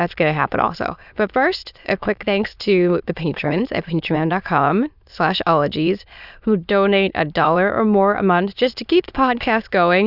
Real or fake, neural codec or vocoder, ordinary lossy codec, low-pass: fake; autoencoder, 22.05 kHz, a latent of 192 numbers a frame, VITS, trained on many speakers; Opus, 64 kbps; 5.4 kHz